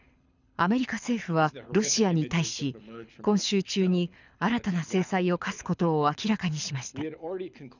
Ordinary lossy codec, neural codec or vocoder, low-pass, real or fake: none; codec, 24 kHz, 6 kbps, HILCodec; 7.2 kHz; fake